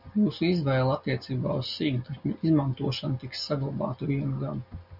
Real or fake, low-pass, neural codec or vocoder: real; 5.4 kHz; none